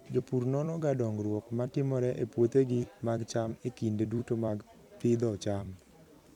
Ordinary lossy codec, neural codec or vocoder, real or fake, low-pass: none; vocoder, 44.1 kHz, 128 mel bands every 256 samples, BigVGAN v2; fake; 19.8 kHz